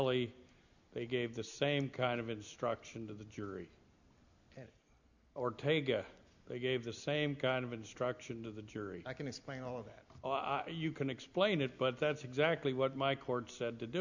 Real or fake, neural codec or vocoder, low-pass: real; none; 7.2 kHz